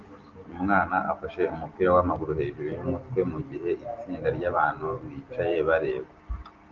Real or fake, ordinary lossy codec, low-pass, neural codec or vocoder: real; Opus, 24 kbps; 7.2 kHz; none